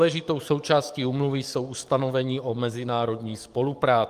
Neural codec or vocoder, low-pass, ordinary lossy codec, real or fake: codec, 44.1 kHz, 7.8 kbps, DAC; 14.4 kHz; Opus, 32 kbps; fake